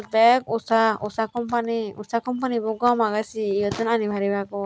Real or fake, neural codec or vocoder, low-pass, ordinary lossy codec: real; none; none; none